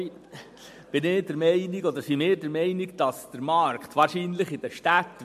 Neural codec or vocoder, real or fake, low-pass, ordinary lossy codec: none; real; 14.4 kHz; MP3, 64 kbps